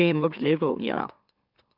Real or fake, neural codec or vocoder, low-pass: fake; autoencoder, 44.1 kHz, a latent of 192 numbers a frame, MeloTTS; 5.4 kHz